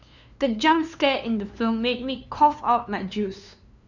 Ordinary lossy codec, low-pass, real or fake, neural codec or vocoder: none; 7.2 kHz; fake; codec, 16 kHz, 2 kbps, FunCodec, trained on LibriTTS, 25 frames a second